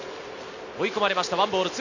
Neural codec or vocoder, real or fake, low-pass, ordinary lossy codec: none; real; 7.2 kHz; none